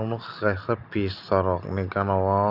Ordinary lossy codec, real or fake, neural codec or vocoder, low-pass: none; real; none; 5.4 kHz